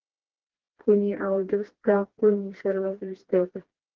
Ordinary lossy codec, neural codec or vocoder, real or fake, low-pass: Opus, 16 kbps; codec, 16 kHz, 2 kbps, FreqCodec, smaller model; fake; 7.2 kHz